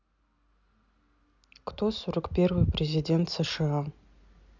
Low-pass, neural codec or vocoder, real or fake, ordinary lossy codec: 7.2 kHz; none; real; none